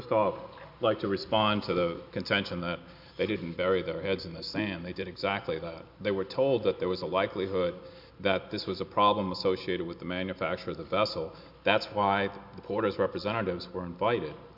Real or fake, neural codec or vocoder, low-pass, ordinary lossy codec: real; none; 5.4 kHz; MP3, 48 kbps